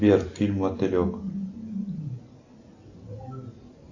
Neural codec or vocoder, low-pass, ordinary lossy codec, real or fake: none; 7.2 kHz; AAC, 48 kbps; real